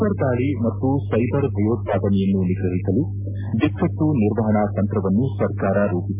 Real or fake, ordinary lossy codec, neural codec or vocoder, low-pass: real; MP3, 32 kbps; none; 3.6 kHz